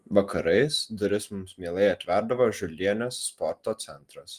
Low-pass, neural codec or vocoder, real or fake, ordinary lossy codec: 14.4 kHz; none; real; Opus, 24 kbps